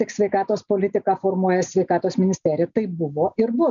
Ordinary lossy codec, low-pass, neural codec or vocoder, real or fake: MP3, 96 kbps; 7.2 kHz; none; real